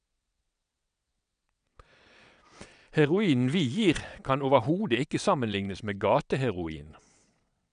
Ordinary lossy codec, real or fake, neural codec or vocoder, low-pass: none; fake; vocoder, 22.05 kHz, 80 mel bands, WaveNeXt; 9.9 kHz